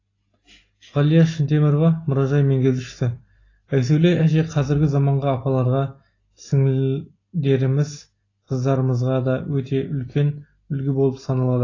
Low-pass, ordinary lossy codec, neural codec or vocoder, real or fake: 7.2 kHz; AAC, 32 kbps; none; real